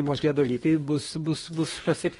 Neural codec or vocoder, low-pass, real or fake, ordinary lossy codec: codec, 24 kHz, 1 kbps, SNAC; 10.8 kHz; fake; AAC, 32 kbps